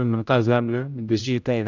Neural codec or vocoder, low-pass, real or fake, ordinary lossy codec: codec, 16 kHz, 0.5 kbps, X-Codec, HuBERT features, trained on general audio; 7.2 kHz; fake; none